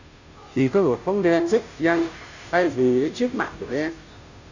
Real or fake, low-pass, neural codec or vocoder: fake; 7.2 kHz; codec, 16 kHz, 0.5 kbps, FunCodec, trained on Chinese and English, 25 frames a second